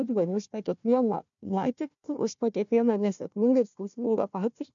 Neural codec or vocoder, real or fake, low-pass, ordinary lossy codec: codec, 16 kHz, 1 kbps, FunCodec, trained on Chinese and English, 50 frames a second; fake; 7.2 kHz; MP3, 64 kbps